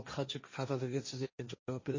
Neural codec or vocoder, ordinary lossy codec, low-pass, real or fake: codec, 16 kHz, 1.1 kbps, Voila-Tokenizer; MP3, 32 kbps; 7.2 kHz; fake